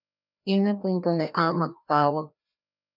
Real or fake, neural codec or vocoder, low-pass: fake; codec, 16 kHz, 1 kbps, FreqCodec, larger model; 5.4 kHz